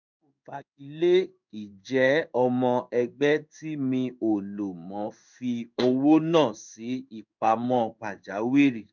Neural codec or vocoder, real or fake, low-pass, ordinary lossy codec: codec, 16 kHz in and 24 kHz out, 1 kbps, XY-Tokenizer; fake; 7.2 kHz; none